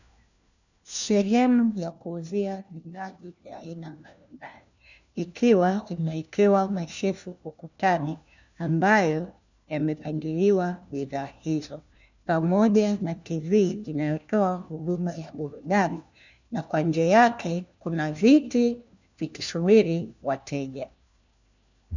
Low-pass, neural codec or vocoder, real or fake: 7.2 kHz; codec, 16 kHz, 1 kbps, FunCodec, trained on LibriTTS, 50 frames a second; fake